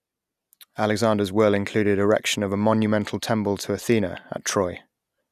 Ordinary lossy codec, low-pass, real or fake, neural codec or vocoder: none; 14.4 kHz; real; none